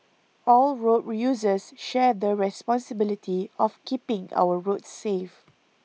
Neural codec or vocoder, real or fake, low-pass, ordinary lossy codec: none; real; none; none